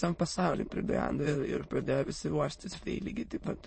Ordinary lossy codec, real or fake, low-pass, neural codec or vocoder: MP3, 32 kbps; fake; 9.9 kHz; autoencoder, 22.05 kHz, a latent of 192 numbers a frame, VITS, trained on many speakers